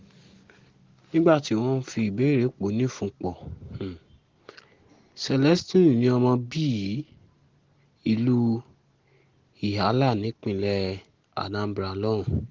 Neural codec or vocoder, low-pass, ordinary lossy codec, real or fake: none; 7.2 kHz; Opus, 16 kbps; real